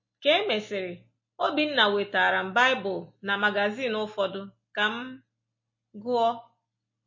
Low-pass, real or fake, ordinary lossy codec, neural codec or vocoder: 7.2 kHz; real; MP3, 32 kbps; none